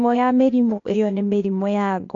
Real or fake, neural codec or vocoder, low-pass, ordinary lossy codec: fake; codec, 16 kHz, 0.8 kbps, ZipCodec; 7.2 kHz; AAC, 48 kbps